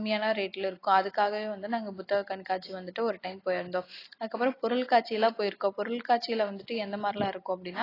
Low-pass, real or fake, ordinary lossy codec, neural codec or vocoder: 5.4 kHz; real; AAC, 24 kbps; none